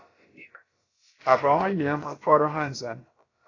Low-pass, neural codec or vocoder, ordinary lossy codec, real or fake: 7.2 kHz; codec, 16 kHz, about 1 kbps, DyCAST, with the encoder's durations; AAC, 32 kbps; fake